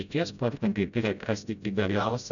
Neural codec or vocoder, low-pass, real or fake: codec, 16 kHz, 0.5 kbps, FreqCodec, smaller model; 7.2 kHz; fake